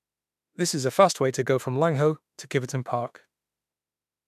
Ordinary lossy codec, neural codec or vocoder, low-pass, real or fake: none; autoencoder, 48 kHz, 32 numbers a frame, DAC-VAE, trained on Japanese speech; 14.4 kHz; fake